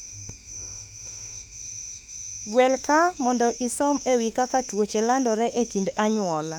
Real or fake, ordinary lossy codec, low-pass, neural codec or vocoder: fake; none; 19.8 kHz; autoencoder, 48 kHz, 32 numbers a frame, DAC-VAE, trained on Japanese speech